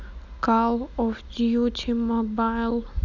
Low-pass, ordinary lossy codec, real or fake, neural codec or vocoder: 7.2 kHz; none; real; none